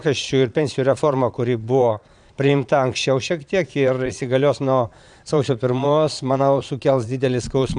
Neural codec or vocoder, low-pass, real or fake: vocoder, 22.05 kHz, 80 mel bands, Vocos; 9.9 kHz; fake